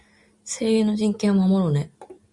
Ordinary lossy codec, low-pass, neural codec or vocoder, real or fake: Opus, 64 kbps; 10.8 kHz; none; real